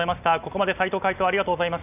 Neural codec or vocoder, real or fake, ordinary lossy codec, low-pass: none; real; none; 3.6 kHz